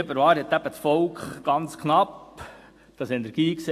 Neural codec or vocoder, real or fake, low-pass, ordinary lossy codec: none; real; 14.4 kHz; AAC, 64 kbps